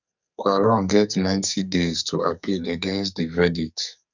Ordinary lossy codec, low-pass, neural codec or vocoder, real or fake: none; 7.2 kHz; codec, 44.1 kHz, 2.6 kbps, SNAC; fake